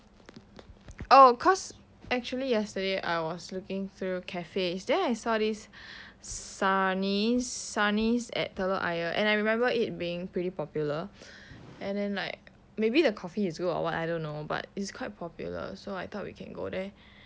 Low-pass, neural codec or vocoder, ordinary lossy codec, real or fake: none; none; none; real